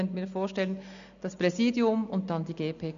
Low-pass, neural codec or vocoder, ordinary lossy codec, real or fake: 7.2 kHz; none; none; real